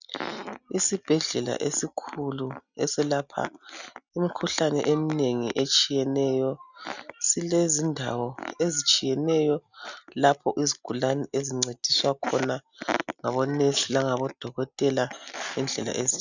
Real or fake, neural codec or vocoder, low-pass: real; none; 7.2 kHz